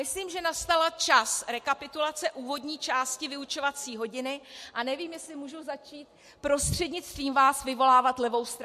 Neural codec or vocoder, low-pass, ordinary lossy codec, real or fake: none; 14.4 kHz; MP3, 64 kbps; real